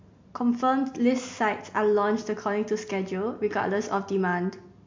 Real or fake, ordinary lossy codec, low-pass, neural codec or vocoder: real; MP3, 48 kbps; 7.2 kHz; none